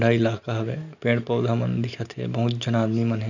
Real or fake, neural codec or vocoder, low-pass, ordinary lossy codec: real; none; 7.2 kHz; none